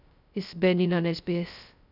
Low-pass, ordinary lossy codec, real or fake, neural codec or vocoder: 5.4 kHz; none; fake; codec, 16 kHz, 0.2 kbps, FocalCodec